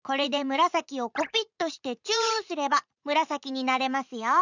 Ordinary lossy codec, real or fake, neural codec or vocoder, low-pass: none; fake; vocoder, 44.1 kHz, 80 mel bands, Vocos; 7.2 kHz